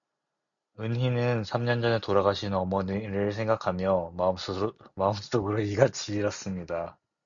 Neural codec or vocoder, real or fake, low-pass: none; real; 7.2 kHz